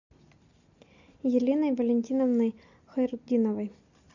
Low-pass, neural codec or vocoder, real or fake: 7.2 kHz; none; real